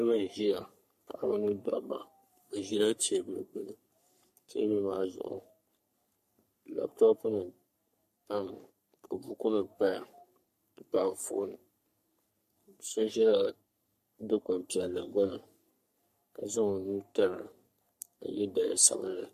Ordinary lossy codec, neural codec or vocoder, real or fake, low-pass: MP3, 64 kbps; codec, 44.1 kHz, 3.4 kbps, Pupu-Codec; fake; 14.4 kHz